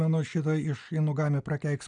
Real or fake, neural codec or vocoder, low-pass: real; none; 9.9 kHz